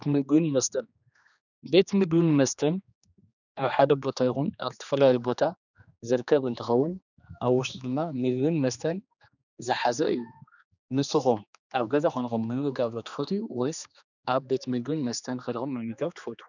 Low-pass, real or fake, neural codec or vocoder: 7.2 kHz; fake; codec, 16 kHz, 2 kbps, X-Codec, HuBERT features, trained on general audio